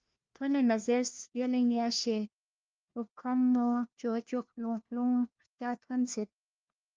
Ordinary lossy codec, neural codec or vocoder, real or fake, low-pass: Opus, 24 kbps; codec, 16 kHz, 1 kbps, FunCodec, trained on LibriTTS, 50 frames a second; fake; 7.2 kHz